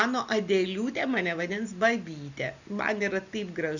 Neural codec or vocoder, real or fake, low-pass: none; real; 7.2 kHz